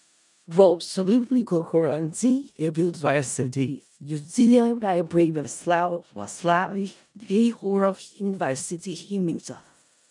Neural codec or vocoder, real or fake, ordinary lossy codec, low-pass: codec, 16 kHz in and 24 kHz out, 0.4 kbps, LongCat-Audio-Codec, four codebook decoder; fake; none; 10.8 kHz